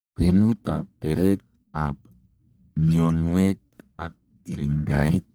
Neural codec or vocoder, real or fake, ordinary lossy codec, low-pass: codec, 44.1 kHz, 1.7 kbps, Pupu-Codec; fake; none; none